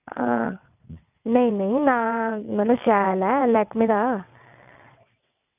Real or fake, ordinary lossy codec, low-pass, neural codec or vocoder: fake; none; 3.6 kHz; vocoder, 22.05 kHz, 80 mel bands, WaveNeXt